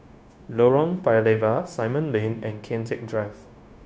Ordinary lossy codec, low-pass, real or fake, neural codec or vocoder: none; none; fake; codec, 16 kHz, 0.9 kbps, LongCat-Audio-Codec